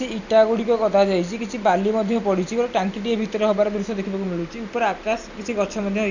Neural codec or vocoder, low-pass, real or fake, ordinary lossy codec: none; 7.2 kHz; real; none